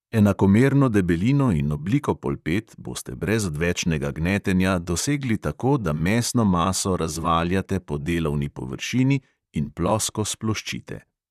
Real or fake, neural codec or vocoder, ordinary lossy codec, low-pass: fake; vocoder, 44.1 kHz, 128 mel bands, Pupu-Vocoder; none; 14.4 kHz